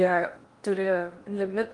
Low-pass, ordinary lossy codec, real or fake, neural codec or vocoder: 10.8 kHz; Opus, 32 kbps; fake; codec, 16 kHz in and 24 kHz out, 0.8 kbps, FocalCodec, streaming, 65536 codes